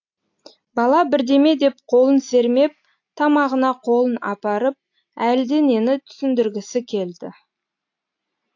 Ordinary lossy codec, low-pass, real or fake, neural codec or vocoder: AAC, 48 kbps; 7.2 kHz; real; none